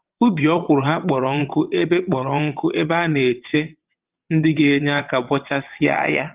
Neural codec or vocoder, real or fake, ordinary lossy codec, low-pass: vocoder, 22.05 kHz, 80 mel bands, WaveNeXt; fake; Opus, 32 kbps; 3.6 kHz